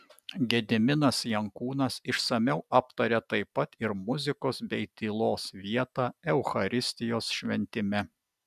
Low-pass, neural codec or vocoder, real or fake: 14.4 kHz; none; real